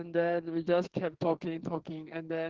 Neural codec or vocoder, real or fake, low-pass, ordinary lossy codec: codec, 44.1 kHz, 2.6 kbps, SNAC; fake; 7.2 kHz; Opus, 16 kbps